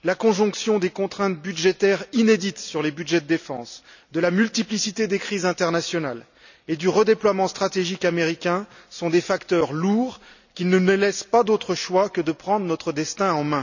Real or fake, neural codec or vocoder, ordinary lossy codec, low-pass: real; none; none; 7.2 kHz